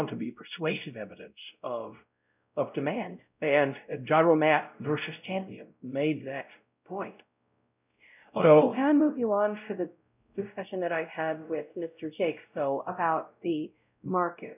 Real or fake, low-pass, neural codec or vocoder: fake; 3.6 kHz; codec, 16 kHz, 0.5 kbps, X-Codec, WavLM features, trained on Multilingual LibriSpeech